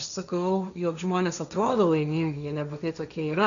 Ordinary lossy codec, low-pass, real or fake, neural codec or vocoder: MP3, 96 kbps; 7.2 kHz; fake; codec, 16 kHz, 1.1 kbps, Voila-Tokenizer